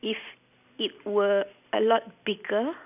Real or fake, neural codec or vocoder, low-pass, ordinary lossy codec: real; none; 3.6 kHz; none